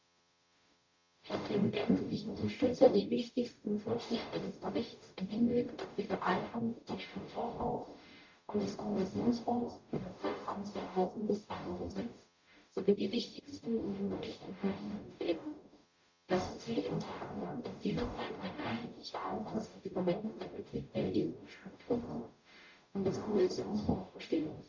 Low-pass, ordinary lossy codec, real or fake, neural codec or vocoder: 7.2 kHz; MP3, 48 kbps; fake; codec, 44.1 kHz, 0.9 kbps, DAC